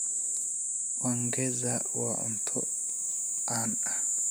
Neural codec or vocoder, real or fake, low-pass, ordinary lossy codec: none; real; none; none